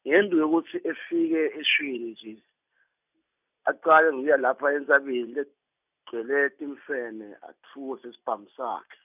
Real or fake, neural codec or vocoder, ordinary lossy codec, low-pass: real; none; none; 3.6 kHz